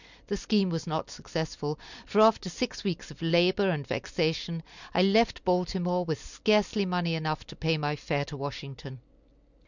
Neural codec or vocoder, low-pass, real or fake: none; 7.2 kHz; real